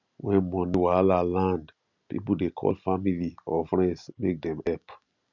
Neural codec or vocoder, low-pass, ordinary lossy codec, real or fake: none; 7.2 kHz; none; real